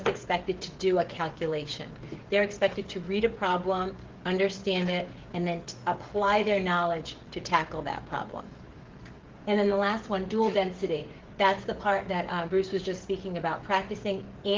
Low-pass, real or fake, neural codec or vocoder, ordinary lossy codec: 7.2 kHz; fake; codec, 16 kHz, 16 kbps, FreqCodec, smaller model; Opus, 16 kbps